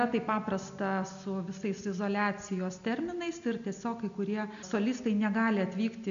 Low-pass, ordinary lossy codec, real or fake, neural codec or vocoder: 7.2 kHz; MP3, 96 kbps; real; none